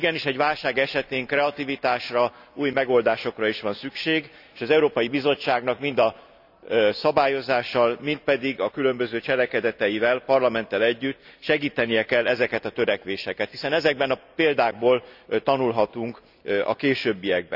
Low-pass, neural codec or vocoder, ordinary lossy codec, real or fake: 5.4 kHz; none; none; real